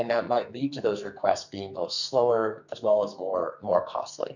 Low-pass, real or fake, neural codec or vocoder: 7.2 kHz; fake; codec, 44.1 kHz, 2.6 kbps, SNAC